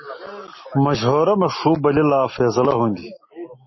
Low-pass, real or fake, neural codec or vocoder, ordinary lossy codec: 7.2 kHz; real; none; MP3, 24 kbps